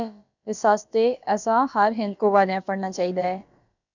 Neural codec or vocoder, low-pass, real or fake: codec, 16 kHz, about 1 kbps, DyCAST, with the encoder's durations; 7.2 kHz; fake